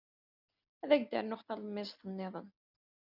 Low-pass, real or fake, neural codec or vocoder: 5.4 kHz; real; none